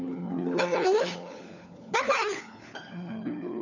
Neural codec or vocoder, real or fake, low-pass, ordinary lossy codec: codec, 16 kHz, 4 kbps, FunCodec, trained on LibriTTS, 50 frames a second; fake; 7.2 kHz; none